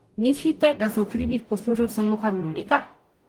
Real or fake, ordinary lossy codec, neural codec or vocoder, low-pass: fake; Opus, 32 kbps; codec, 44.1 kHz, 0.9 kbps, DAC; 19.8 kHz